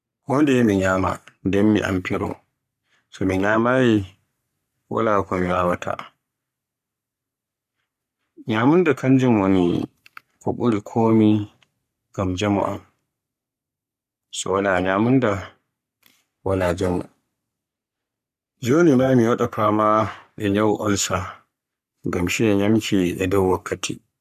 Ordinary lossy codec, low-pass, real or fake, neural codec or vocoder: none; 14.4 kHz; fake; codec, 44.1 kHz, 3.4 kbps, Pupu-Codec